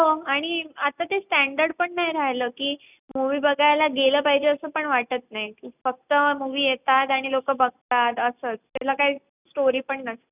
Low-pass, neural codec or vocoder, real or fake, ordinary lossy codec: 3.6 kHz; none; real; none